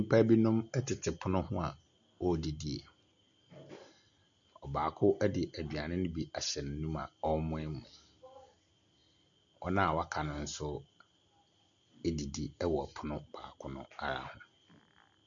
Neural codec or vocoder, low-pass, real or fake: none; 7.2 kHz; real